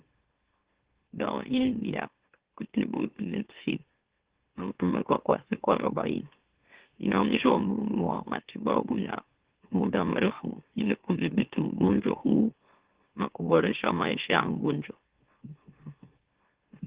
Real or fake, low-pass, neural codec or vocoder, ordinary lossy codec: fake; 3.6 kHz; autoencoder, 44.1 kHz, a latent of 192 numbers a frame, MeloTTS; Opus, 16 kbps